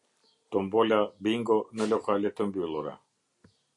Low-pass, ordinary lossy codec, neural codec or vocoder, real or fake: 10.8 kHz; MP3, 48 kbps; none; real